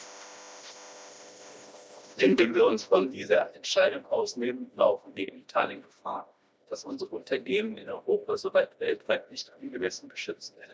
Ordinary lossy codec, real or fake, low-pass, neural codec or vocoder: none; fake; none; codec, 16 kHz, 1 kbps, FreqCodec, smaller model